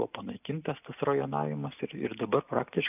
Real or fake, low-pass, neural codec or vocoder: real; 3.6 kHz; none